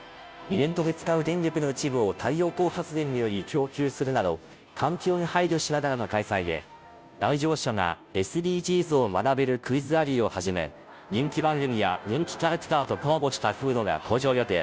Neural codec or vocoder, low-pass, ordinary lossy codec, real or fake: codec, 16 kHz, 0.5 kbps, FunCodec, trained on Chinese and English, 25 frames a second; none; none; fake